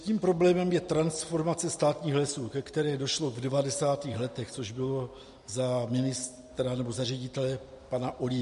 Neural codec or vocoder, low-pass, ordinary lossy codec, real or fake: none; 14.4 kHz; MP3, 48 kbps; real